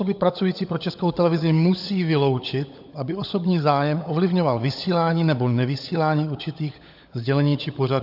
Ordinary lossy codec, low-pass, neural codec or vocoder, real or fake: AAC, 48 kbps; 5.4 kHz; codec, 16 kHz, 16 kbps, FunCodec, trained on LibriTTS, 50 frames a second; fake